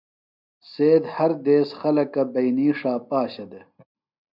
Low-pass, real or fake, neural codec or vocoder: 5.4 kHz; real; none